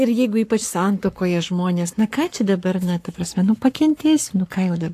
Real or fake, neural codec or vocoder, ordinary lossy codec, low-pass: fake; codec, 44.1 kHz, 7.8 kbps, Pupu-Codec; AAC, 64 kbps; 14.4 kHz